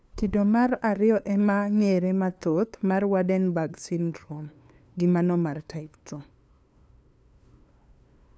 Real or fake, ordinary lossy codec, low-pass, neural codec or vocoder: fake; none; none; codec, 16 kHz, 2 kbps, FunCodec, trained on LibriTTS, 25 frames a second